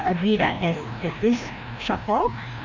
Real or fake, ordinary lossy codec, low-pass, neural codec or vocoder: fake; none; 7.2 kHz; codec, 16 kHz, 1 kbps, FreqCodec, larger model